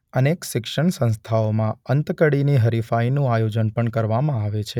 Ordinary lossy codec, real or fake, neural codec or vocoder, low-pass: none; real; none; 19.8 kHz